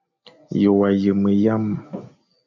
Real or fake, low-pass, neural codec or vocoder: real; 7.2 kHz; none